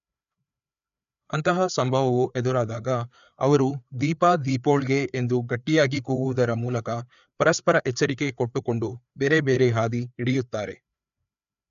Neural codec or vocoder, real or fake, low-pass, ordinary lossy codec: codec, 16 kHz, 4 kbps, FreqCodec, larger model; fake; 7.2 kHz; MP3, 96 kbps